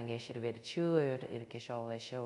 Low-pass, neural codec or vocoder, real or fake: 10.8 kHz; codec, 24 kHz, 0.5 kbps, DualCodec; fake